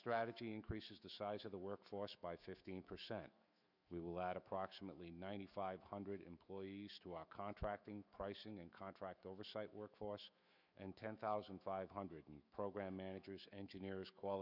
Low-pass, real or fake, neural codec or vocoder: 5.4 kHz; fake; autoencoder, 48 kHz, 128 numbers a frame, DAC-VAE, trained on Japanese speech